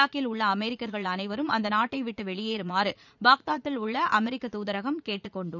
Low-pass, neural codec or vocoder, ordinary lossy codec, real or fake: 7.2 kHz; vocoder, 44.1 kHz, 80 mel bands, Vocos; none; fake